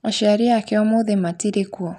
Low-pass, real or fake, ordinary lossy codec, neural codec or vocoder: 14.4 kHz; real; none; none